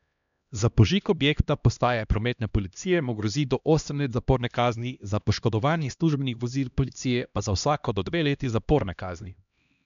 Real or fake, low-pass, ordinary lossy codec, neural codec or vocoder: fake; 7.2 kHz; none; codec, 16 kHz, 1 kbps, X-Codec, HuBERT features, trained on LibriSpeech